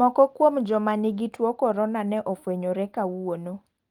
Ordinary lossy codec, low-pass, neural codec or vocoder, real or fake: Opus, 24 kbps; 19.8 kHz; none; real